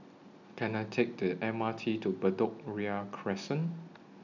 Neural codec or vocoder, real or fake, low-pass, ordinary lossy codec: none; real; 7.2 kHz; none